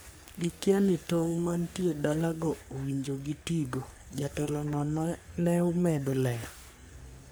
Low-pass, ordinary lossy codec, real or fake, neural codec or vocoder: none; none; fake; codec, 44.1 kHz, 3.4 kbps, Pupu-Codec